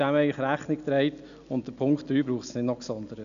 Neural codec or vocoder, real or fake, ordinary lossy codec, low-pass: none; real; none; 7.2 kHz